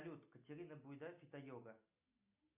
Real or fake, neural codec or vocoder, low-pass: real; none; 3.6 kHz